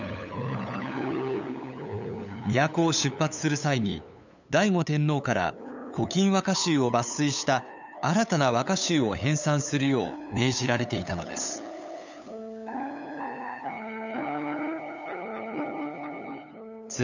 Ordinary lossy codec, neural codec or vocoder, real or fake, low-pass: none; codec, 16 kHz, 8 kbps, FunCodec, trained on LibriTTS, 25 frames a second; fake; 7.2 kHz